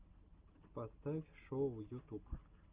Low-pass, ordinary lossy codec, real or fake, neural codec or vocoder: 3.6 kHz; Opus, 32 kbps; real; none